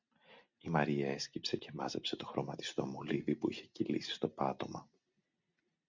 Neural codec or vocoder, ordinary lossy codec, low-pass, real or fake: none; MP3, 64 kbps; 7.2 kHz; real